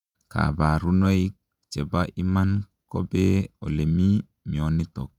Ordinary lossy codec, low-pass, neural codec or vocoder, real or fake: none; 19.8 kHz; vocoder, 48 kHz, 128 mel bands, Vocos; fake